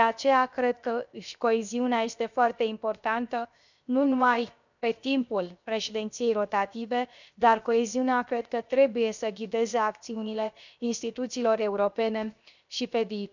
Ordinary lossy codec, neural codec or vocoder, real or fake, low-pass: none; codec, 16 kHz, 0.7 kbps, FocalCodec; fake; 7.2 kHz